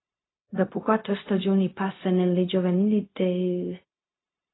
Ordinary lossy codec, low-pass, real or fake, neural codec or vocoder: AAC, 16 kbps; 7.2 kHz; fake; codec, 16 kHz, 0.4 kbps, LongCat-Audio-Codec